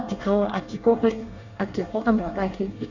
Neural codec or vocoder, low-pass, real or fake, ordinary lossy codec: codec, 24 kHz, 1 kbps, SNAC; 7.2 kHz; fake; none